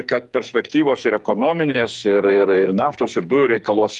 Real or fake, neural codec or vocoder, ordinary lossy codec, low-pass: fake; codec, 44.1 kHz, 2.6 kbps, SNAC; Opus, 32 kbps; 10.8 kHz